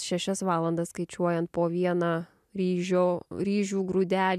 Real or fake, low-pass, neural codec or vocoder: real; 14.4 kHz; none